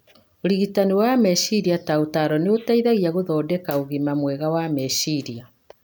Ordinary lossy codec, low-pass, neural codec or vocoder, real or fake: none; none; none; real